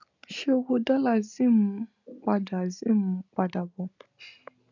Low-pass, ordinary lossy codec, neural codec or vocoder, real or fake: 7.2 kHz; none; none; real